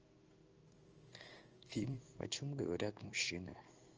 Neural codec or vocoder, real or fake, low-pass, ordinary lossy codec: codec, 16 kHz in and 24 kHz out, 1 kbps, XY-Tokenizer; fake; 7.2 kHz; Opus, 24 kbps